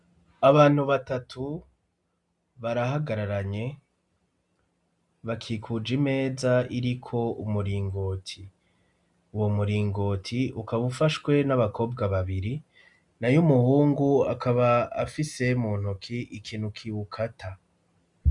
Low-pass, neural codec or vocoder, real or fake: 10.8 kHz; none; real